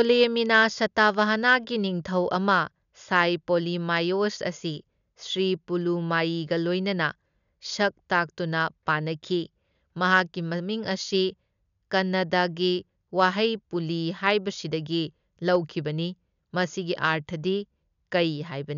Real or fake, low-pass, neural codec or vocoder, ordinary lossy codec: real; 7.2 kHz; none; none